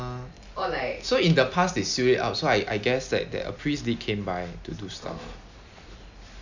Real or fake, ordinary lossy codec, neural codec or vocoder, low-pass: real; none; none; 7.2 kHz